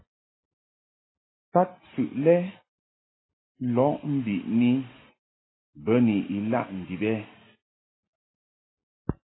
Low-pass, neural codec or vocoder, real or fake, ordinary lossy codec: 7.2 kHz; none; real; AAC, 16 kbps